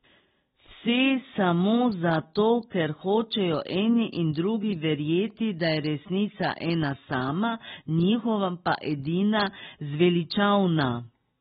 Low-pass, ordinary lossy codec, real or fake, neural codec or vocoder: 14.4 kHz; AAC, 16 kbps; real; none